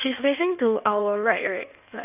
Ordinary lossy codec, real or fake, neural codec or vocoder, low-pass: none; fake; codec, 16 kHz in and 24 kHz out, 1.1 kbps, FireRedTTS-2 codec; 3.6 kHz